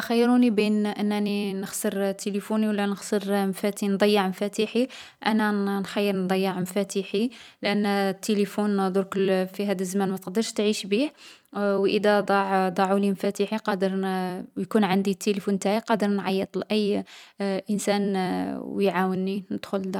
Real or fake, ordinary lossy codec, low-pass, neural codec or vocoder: fake; none; 19.8 kHz; vocoder, 44.1 kHz, 128 mel bands every 256 samples, BigVGAN v2